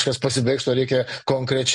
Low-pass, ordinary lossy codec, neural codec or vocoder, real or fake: 10.8 kHz; MP3, 48 kbps; none; real